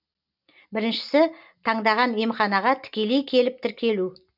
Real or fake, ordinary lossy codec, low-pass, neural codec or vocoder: real; none; 5.4 kHz; none